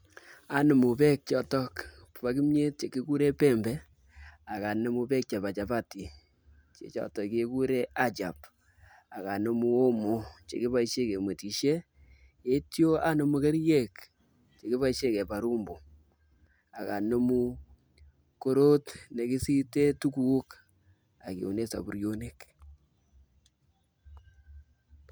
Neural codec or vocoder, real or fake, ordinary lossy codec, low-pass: none; real; none; none